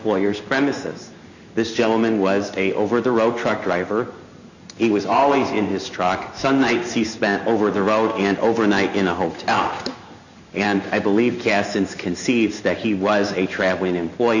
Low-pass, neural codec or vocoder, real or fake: 7.2 kHz; codec, 16 kHz in and 24 kHz out, 1 kbps, XY-Tokenizer; fake